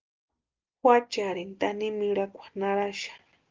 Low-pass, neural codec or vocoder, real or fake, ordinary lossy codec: 7.2 kHz; none; real; Opus, 32 kbps